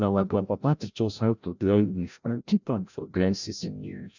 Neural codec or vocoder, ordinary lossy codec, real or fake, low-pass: codec, 16 kHz, 0.5 kbps, FreqCodec, larger model; none; fake; 7.2 kHz